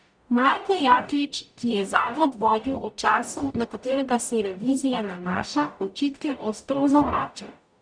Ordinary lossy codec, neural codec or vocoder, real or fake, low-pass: none; codec, 44.1 kHz, 0.9 kbps, DAC; fake; 9.9 kHz